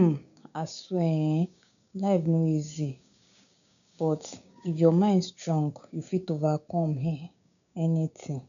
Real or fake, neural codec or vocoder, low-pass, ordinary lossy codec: fake; codec, 16 kHz, 6 kbps, DAC; 7.2 kHz; none